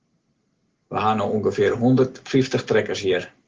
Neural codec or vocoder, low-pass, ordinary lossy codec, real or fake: none; 7.2 kHz; Opus, 16 kbps; real